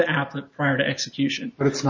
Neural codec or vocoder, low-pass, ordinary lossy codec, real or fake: none; 7.2 kHz; MP3, 64 kbps; real